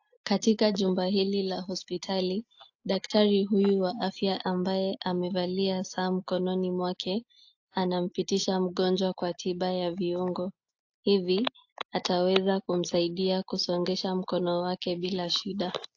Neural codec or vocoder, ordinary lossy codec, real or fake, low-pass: none; AAC, 48 kbps; real; 7.2 kHz